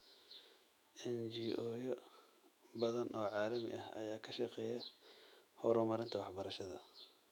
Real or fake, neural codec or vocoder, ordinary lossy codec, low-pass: fake; autoencoder, 48 kHz, 128 numbers a frame, DAC-VAE, trained on Japanese speech; none; 19.8 kHz